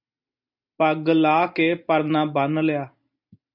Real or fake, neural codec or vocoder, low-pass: real; none; 5.4 kHz